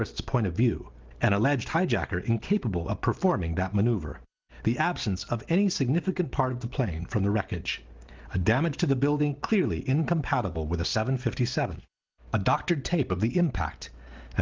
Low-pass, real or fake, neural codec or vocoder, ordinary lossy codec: 7.2 kHz; real; none; Opus, 32 kbps